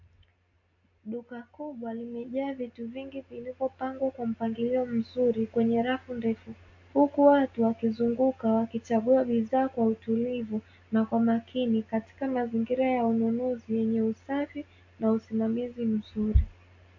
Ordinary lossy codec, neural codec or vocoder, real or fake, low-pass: AAC, 48 kbps; none; real; 7.2 kHz